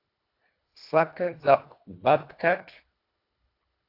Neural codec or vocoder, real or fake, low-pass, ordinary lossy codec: codec, 24 kHz, 1.5 kbps, HILCodec; fake; 5.4 kHz; AAC, 32 kbps